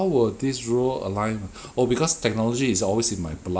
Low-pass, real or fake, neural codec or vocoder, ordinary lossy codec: none; real; none; none